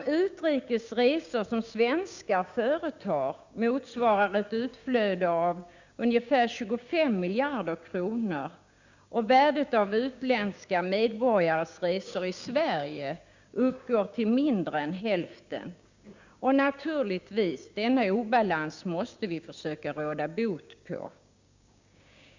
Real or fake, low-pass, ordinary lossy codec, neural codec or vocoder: fake; 7.2 kHz; Opus, 64 kbps; codec, 16 kHz, 6 kbps, DAC